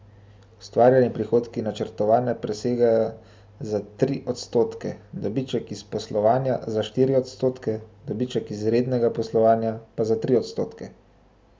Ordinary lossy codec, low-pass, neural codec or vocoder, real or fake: none; none; none; real